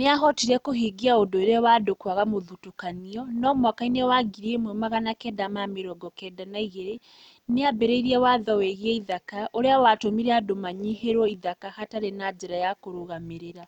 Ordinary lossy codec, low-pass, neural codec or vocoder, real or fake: none; 19.8 kHz; none; real